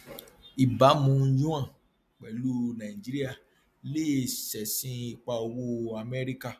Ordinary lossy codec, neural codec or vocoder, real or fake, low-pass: MP3, 96 kbps; none; real; 14.4 kHz